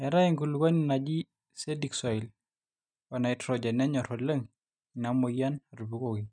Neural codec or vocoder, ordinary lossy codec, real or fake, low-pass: none; none; real; 9.9 kHz